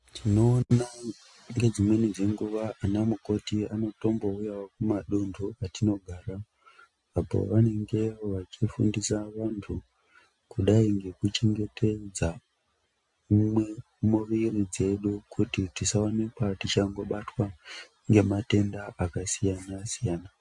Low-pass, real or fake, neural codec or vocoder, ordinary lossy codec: 10.8 kHz; real; none; MP3, 64 kbps